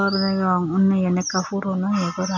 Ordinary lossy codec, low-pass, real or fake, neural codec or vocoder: none; 7.2 kHz; real; none